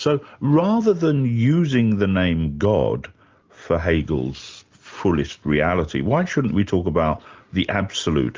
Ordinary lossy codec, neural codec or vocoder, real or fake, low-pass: Opus, 24 kbps; none; real; 7.2 kHz